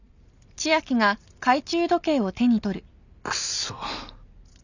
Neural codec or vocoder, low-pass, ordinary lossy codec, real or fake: none; 7.2 kHz; none; real